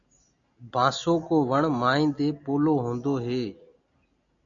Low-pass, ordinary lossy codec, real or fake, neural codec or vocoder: 7.2 kHz; MP3, 64 kbps; real; none